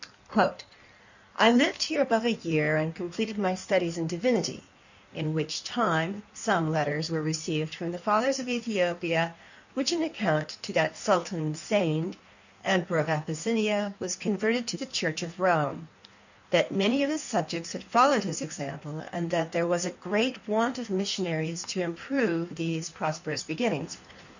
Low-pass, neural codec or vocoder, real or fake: 7.2 kHz; codec, 16 kHz in and 24 kHz out, 1.1 kbps, FireRedTTS-2 codec; fake